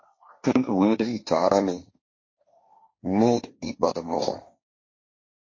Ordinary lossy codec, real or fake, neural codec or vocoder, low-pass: MP3, 32 kbps; fake; codec, 16 kHz, 1.1 kbps, Voila-Tokenizer; 7.2 kHz